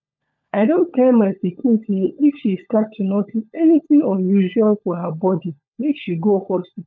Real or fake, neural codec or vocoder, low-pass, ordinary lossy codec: fake; codec, 16 kHz, 16 kbps, FunCodec, trained on LibriTTS, 50 frames a second; 7.2 kHz; none